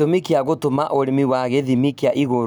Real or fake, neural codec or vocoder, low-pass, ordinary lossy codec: real; none; none; none